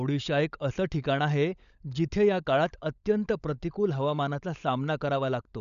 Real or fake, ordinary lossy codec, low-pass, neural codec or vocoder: fake; none; 7.2 kHz; codec, 16 kHz, 16 kbps, FunCodec, trained on LibriTTS, 50 frames a second